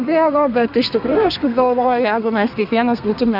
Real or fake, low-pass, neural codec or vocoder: fake; 5.4 kHz; codec, 32 kHz, 1.9 kbps, SNAC